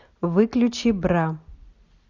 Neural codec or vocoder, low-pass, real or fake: none; 7.2 kHz; real